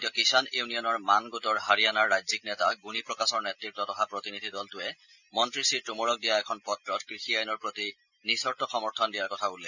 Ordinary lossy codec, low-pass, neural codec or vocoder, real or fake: none; 7.2 kHz; none; real